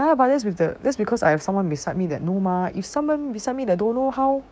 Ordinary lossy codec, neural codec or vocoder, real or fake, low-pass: none; codec, 16 kHz, 6 kbps, DAC; fake; none